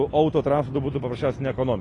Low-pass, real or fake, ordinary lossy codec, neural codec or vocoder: 10.8 kHz; real; AAC, 32 kbps; none